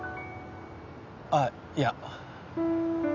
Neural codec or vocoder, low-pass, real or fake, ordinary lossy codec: none; 7.2 kHz; real; none